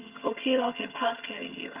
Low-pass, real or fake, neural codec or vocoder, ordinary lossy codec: 3.6 kHz; fake; vocoder, 22.05 kHz, 80 mel bands, HiFi-GAN; Opus, 24 kbps